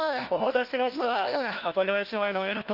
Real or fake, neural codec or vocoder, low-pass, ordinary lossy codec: fake; codec, 16 kHz, 1 kbps, FunCodec, trained on LibriTTS, 50 frames a second; 5.4 kHz; Opus, 24 kbps